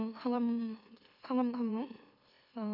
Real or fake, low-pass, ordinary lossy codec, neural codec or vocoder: fake; 5.4 kHz; none; autoencoder, 44.1 kHz, a latent of 192 numbers a frame, MeloTTS